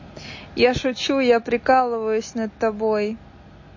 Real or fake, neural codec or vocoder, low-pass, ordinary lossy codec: real; none; 7.2 kHz; MP3, 32 kbps